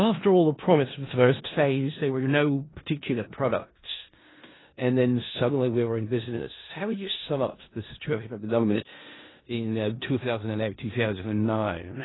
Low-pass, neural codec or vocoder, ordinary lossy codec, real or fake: 7.2 kHz; codec, 16 kHz in and 24 kHz out, 0.4 kbps, LongCat-Audio-Codec, four codebook decoder; AAC, 16 kbps; fake